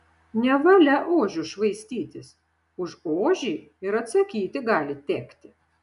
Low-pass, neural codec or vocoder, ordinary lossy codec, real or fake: 10.8 kHz; none; MP3, 96 kbps; real